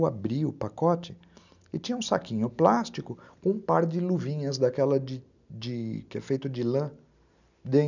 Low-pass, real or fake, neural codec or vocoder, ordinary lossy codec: 7.2 kHz; real; none; none